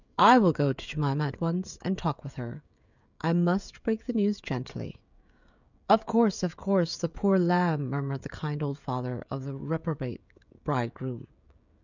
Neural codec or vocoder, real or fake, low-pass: codec, 16 kHz, 16 kbps, FreqCodec, smaller model; fake; 7.2 kHz